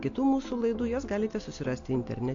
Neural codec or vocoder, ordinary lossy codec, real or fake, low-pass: none; AAC, 48 kbps; real; 7.2 kHz